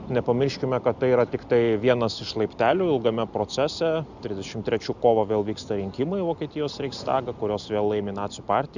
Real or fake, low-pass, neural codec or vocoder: real; 7.2 kHz; none